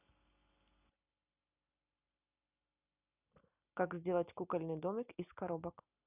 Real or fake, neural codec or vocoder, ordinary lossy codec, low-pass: real; none; none; 3.6 kHz